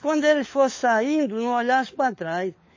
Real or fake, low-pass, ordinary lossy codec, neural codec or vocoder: fake; 7.2 kHz; MP3, 32 kbps; codec, 16 kHz, 4 kbps, FunCodec, trained on LibriTTS, 50 frames a second